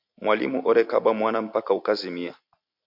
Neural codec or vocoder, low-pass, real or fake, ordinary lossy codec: none; 5.4 kHz; real; MP3, 48 kbps